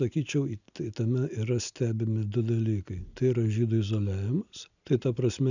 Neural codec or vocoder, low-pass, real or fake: none; 7.2 kHz; real